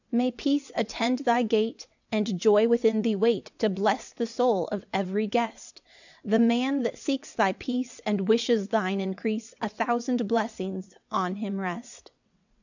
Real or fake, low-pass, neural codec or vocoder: fake; 7.2 kHz; vocoder, 22.05 kHz, 80 mel bands, WaveNeXt